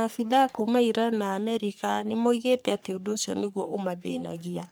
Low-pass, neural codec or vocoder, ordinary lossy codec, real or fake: none; codec, 44.1 kHz, 3.4 kbps, Pupu-Codec; none; fake